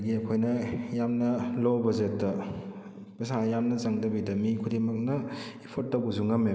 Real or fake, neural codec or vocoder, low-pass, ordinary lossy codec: real; none; none; none